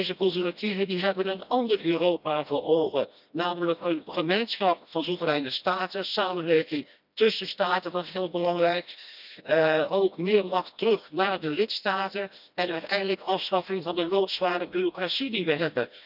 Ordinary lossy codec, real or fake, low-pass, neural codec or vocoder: none; fake; 5.4 kHz; codec, 16 kHz, 1 kbps, FreqCodec, smaller model